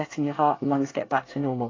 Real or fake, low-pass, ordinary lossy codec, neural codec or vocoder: fake; 7.2 kHz; AAC, 32 kbps; codec, 24 kHz, 1 kbps, SNAC